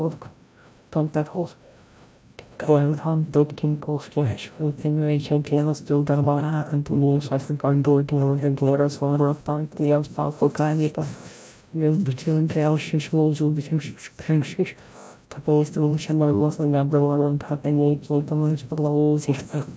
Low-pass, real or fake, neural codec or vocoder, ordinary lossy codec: none; fake; codec, 16 kHz, 0.5 kbps, FreqCodec, larger model; none